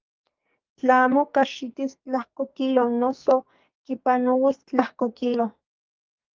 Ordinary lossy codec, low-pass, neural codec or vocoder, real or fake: Opus, 24 kbps; 7.2 kHz; codec, 44.1 kHz, 2.6 kbps, SNAC; fake